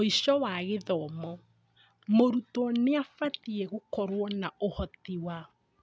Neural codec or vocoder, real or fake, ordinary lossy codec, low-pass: none; real; none; none